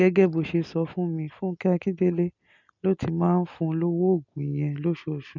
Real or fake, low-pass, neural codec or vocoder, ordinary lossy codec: real; 7.2 kHz; none; none